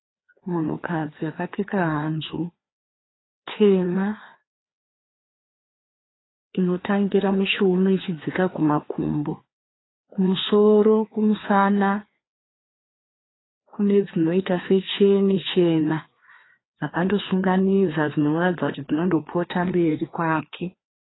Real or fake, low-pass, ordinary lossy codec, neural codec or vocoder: fake; 7.2 kHz; AAC, 16 kbps; codec, 16 kHz, 2 kbps, FreqCodec, larger model